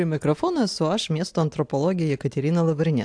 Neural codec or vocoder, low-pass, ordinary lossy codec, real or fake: none; 9.9 kHz; AAC, 64 kbps; real